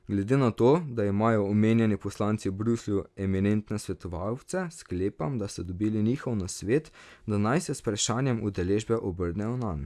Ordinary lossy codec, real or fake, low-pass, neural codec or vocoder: none; real; none; none